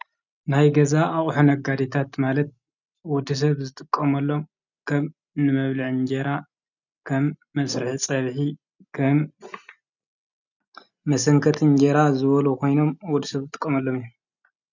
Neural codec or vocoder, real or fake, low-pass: none; real; 7.2 kHz